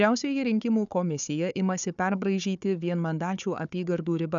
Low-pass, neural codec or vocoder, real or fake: 7.2 kHz; codec, 16 kHz, 4 kbps, FunCodec, trained on Chinese and English, 50 frames a second; fake